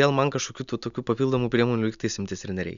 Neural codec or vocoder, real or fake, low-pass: none; real; 7.2 kHz